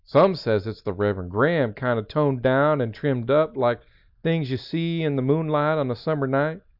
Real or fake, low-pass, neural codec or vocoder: real; 5.4 kHz; none